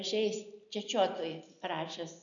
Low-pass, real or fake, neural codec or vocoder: 7.2 kHz; real; none